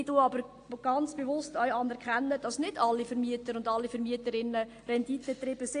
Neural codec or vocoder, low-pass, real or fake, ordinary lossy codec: none; 9.9 kHz; real; AAC, 48 kbps